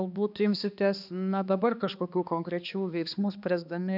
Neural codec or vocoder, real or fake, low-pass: codec, 16 kHz, 2 kbps, X-Codec, HuBERT features, trained on balanced general audio; fake; 5.4 kHz